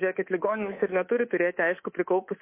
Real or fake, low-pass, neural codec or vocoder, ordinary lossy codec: fake; 3.6 kHz; codec, 24 kHz, 3.1 kbps, DualCodec; MP3, 24 kbps